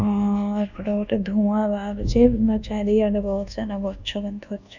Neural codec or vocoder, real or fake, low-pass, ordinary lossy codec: codec, 24 kHz, 1.2 kbps, DualCodec; fake; 7.2 kHz; none